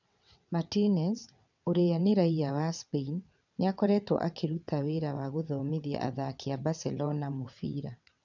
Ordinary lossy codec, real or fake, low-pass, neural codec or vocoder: none; fake; 7.2 kHz; vocoder, 22.05 kHz, 80 mel bands, WaveNeXt